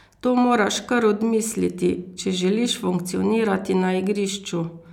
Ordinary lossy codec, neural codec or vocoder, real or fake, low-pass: none; none; real; 19.8 kHz